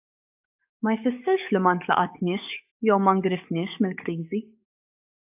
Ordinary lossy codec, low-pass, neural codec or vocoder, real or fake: AAC, 32 kbps; 3.6 kHz; codec, 44.1 kHz, 7.8 kbps, DAC; fake